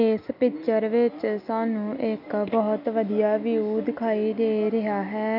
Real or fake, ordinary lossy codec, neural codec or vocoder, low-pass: real; none; none; 5.4 kHz